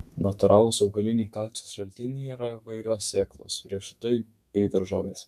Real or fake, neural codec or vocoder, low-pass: fake; codec, 32 kHz, 1.9 kbps, SNAC; 14.4 kHz